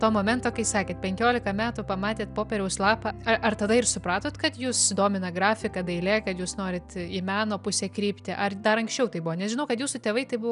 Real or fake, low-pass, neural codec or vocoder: real; 10.8 kHz; none